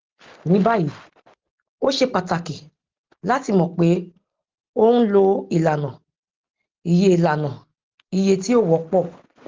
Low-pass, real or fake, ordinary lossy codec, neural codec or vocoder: 7.2 kHz; real; Opus, 16 kbps; none